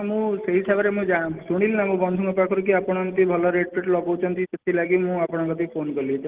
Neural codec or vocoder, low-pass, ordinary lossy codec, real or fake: none; 3.6 kHz; Opus, 16 kbps; real